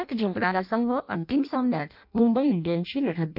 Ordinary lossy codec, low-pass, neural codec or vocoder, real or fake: none; 5.4 kHz; codec, 16 kHz in and 24 kHz out, 0.6 kbps, FireRedTTS-2 codec; fake